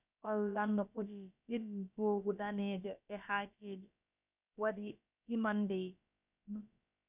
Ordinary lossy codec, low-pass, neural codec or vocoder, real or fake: none; 3.6 kHz; codec, 16 kHz, about 1 kbps, DyCAST, with the encoder's durations; fake